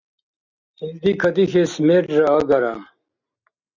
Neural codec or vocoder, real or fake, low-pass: none; real; 7.2 kHz